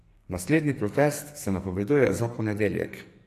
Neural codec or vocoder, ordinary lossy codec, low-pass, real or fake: codec, 44.1 kHz, 2.6 kbps, SNAC; AAC, 64 kbps; 14.4 kHz; fake